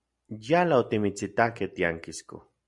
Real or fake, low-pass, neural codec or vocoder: real; 10.8 kHz; none